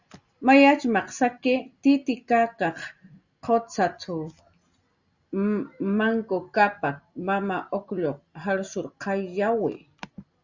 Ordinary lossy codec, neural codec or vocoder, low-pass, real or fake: Opus, 64 kbps; none; 7.2 kHz; real